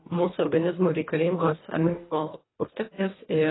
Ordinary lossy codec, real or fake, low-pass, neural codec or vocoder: AAC, 16 kbps; fake; 7.2 kHz; codec, 24 kHz, 1.5 kbps, HILCodec